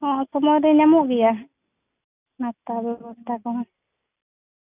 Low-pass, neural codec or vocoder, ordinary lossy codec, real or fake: 3.6 kHz; none; none; real